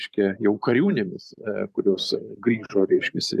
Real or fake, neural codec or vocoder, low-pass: fake; vocoder, 44.1 kHz, 128 mel bands every 512 samples, BigVGAN v2; 14.4 kHz